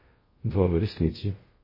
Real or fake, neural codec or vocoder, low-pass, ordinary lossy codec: fake; codec, 24 kHz, 0.5 kbps, DualCodec; 5.4 kHz; MP3, 24 kbps